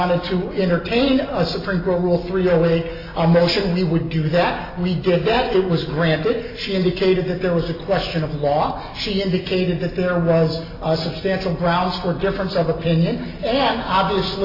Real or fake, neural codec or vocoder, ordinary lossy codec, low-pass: real; none; AAC, 24 kbps; 5.4 kHz